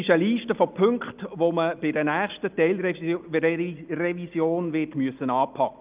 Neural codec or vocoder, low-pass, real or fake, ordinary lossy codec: none; 3.6 kHz; real; Opus, 24 kbps